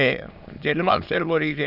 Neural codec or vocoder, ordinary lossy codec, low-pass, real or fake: autoencoder, 22.05 kHz, a latent of 192 numbers a frame, VITS, trained on many speakers; none; 5.4 kHz; fake